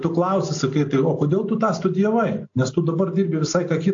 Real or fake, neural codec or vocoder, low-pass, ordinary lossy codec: real; none; 7.2 kHz; AAC, 48 kbps